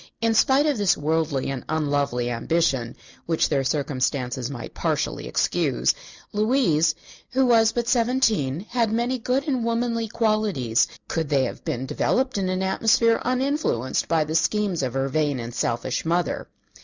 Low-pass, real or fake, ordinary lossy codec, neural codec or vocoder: 7.2 kHz; real; Opus, 64 kbps; none